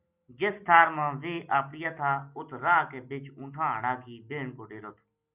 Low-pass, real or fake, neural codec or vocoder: 3.6 kHz; real; none